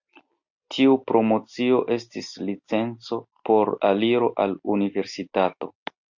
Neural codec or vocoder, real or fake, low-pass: none; real; 7.2 kHz